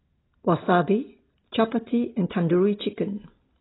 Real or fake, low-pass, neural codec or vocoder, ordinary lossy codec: real; 7.2 kHz; none; AAC, 16 kbps